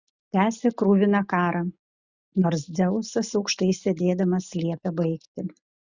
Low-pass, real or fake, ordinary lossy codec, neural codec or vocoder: 7.2 kHz; real; Opus, 64 kbps; none